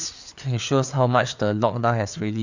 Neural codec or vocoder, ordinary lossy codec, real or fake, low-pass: codec, 16 kHz, 4 kbps, FreqCodec, larger model; none; fake; 7.2 kHz